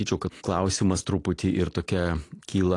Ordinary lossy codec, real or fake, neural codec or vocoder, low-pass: AAC, 48 kbps; real; none; 10.8 kHz